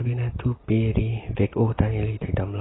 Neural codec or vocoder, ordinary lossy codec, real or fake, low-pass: none; AAC, 16 kbps; real; 7.2 kHz